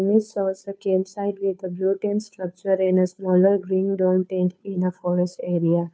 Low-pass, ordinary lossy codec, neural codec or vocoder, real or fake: none; none; codec, 16 kHz, 2 kbps, FunCodec, trained on Chinese and English, 25 frames a second; fake